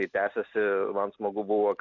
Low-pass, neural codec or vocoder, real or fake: 7.2 kHz; none; real